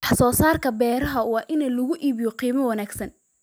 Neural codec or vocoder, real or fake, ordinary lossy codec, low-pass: none; real; none; none